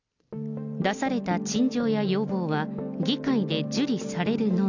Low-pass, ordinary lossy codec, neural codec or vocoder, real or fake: 7.2 kHz; none; none; real